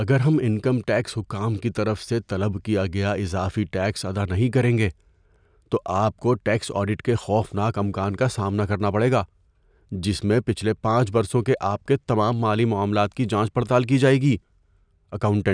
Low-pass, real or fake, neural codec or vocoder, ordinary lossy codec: 9.9 kHz; real; none; none